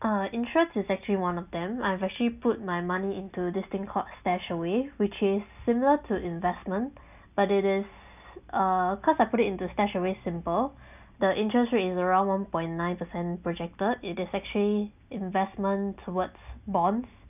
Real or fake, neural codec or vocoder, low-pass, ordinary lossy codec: real; none; 3.6 kHz; none